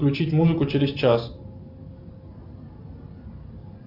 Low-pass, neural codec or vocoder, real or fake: 5.4 kHz; none; real